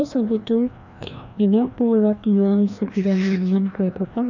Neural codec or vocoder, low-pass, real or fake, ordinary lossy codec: codec, 16 kHz, 1 kbps, FreqCodec, larger model; 7.2 kHz; fake; none